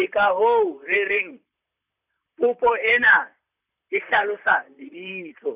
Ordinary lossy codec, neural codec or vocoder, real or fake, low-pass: none; vocoder, 44.1 kHz, 128 mel bands, Pupu-Vocoder; fake; 3.6 kHz